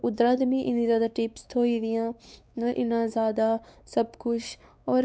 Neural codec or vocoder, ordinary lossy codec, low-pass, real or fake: codec, 16 kHz, 4 kbps, X-Codec, WavLM features, trained on Multilingual LibriSpeech; none; none; fake